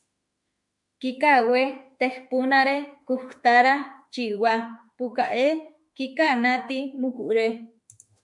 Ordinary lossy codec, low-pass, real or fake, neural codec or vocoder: MP3, 96 kbps; 10.8 kHz; fake; autoencoder, 48 kHz, 32 numbers a frame, DAC-VAE, trained on Japanese speech